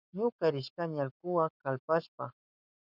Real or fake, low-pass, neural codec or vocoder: fake; 5.4 kHz; codec, 44.1 kHz, 7.8 kbps, Pupu-Codec